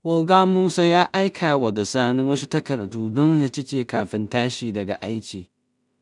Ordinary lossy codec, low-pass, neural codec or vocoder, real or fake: none; 10.8 kHz; codec, 16 kHz in and 24 kHz out, 0.4 kbps, LongCat-Audio-Codec, two codebook decoder; fake